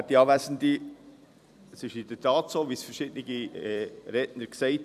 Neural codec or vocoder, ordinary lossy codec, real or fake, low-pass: vocoder, 44.1 kHz, 128 mel bands every 256 samples, BigVGAN v2; none; fake; 14.4 kHz